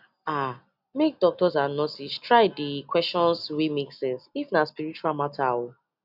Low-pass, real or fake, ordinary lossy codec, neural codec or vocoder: 5.4 kHz; real; none; none